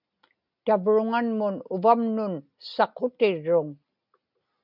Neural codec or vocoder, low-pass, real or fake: none; 5.4 kHz; real